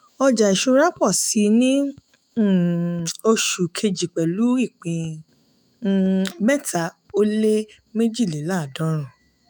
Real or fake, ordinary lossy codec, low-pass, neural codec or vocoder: fake; none; none; autoencoder, 48 kHz, 128 numbers a frame, DAC-VAE, trained on Japanese speech